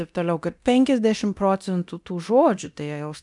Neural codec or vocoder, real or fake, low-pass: codec, 24 kHz, 0.9 kbps, DualCodec; fake; 10.8 kHz